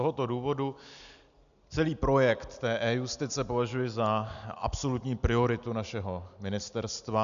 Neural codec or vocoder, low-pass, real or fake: none; 7.2 kHz; real